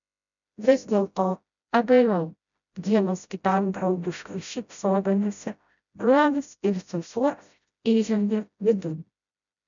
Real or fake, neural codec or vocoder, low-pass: fake; codec, 16 kHz, 0.5 kbps, FreqCodec, smaller model; 7.2 kHz